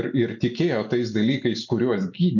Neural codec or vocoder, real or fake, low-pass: none; real; 7.2 kHz